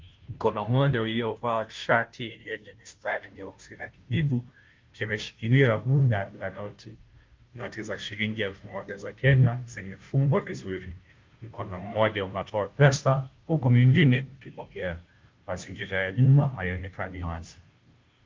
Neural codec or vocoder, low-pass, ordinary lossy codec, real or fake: codec, 16 kHz, 0.5 kbps, FunCodec, trained on Chinese and English, 25 frames a second; 7.2 kHz; Opus, 32 kbps; fake